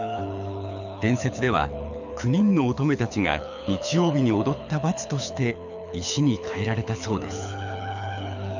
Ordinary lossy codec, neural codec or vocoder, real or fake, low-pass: none; codec, 24 kHz, 6 kbps, HILCodec; fake; 7.2 kHz